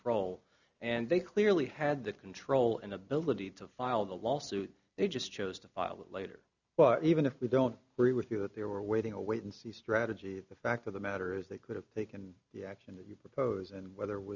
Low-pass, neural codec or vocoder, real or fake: 7.2 kHz; none; real